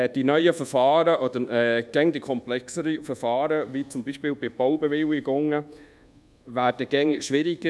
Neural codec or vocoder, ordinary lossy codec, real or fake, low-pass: codec, 24 kHz, 1.2 kbps, DualCodec; none; fake; none